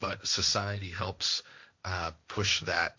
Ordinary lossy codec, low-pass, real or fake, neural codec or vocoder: MP3, 48 kbps; 7.2 kHz; fake; codec, 16 kHz, 1.1 kbps, Voila-Tokenizer